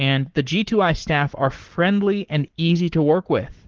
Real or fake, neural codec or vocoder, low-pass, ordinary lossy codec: fake; codec, 44.1 kHz, 7.8 kbps, Pupu-Codec; 7.2 kHz; Opus, 16 kbps